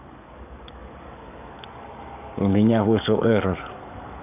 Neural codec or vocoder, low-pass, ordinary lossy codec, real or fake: codec, 44.1 kHz, 7.8 kbps, DAC; 3.6 kHz; none; fake